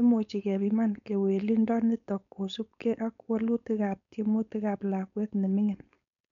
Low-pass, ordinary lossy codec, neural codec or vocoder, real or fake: 7.2 kHz; none; codec, 16 kHz, 4.8 kbps, FACodec; fake